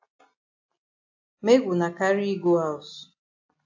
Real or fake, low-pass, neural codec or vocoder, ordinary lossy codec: real; 7.2 kHz; none; AAC, 48 kbps